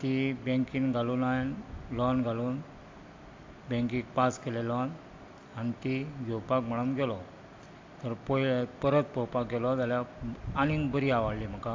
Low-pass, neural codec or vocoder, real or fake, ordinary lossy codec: 7.2 kHz; none; real; AAC, 48 kbps